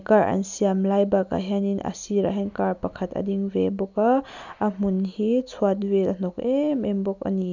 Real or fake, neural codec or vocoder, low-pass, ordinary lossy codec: real; none; 7.2 kHz; none